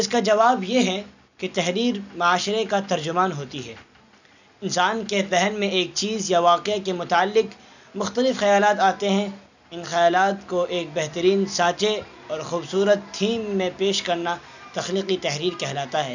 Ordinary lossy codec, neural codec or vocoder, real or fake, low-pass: none; none; real; 7.2 kHz